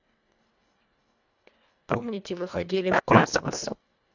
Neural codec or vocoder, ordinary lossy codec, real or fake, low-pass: codec, 24 kHz, 1.5 kbps, HILCodec; none; fake; 7.2 kHz